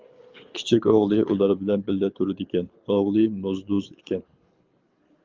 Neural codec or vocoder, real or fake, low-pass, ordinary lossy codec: codec, 24 kHz, 6 kbps, HILCodec; fake; 7.2 kHz; Opus, 24 kbps